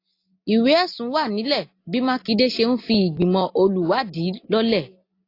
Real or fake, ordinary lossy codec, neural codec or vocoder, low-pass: real; AAC, 32 kbps; none; 5.4 kHz